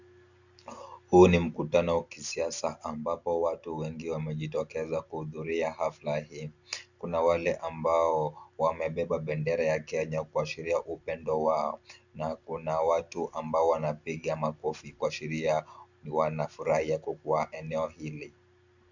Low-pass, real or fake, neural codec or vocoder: 7.2 kHz; real; none